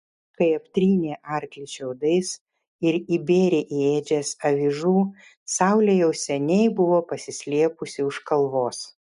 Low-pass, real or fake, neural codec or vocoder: 10.8 kHz; real; none